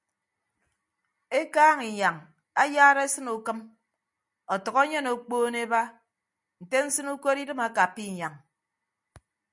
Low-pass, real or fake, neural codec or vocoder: 10.8 kHz; real; none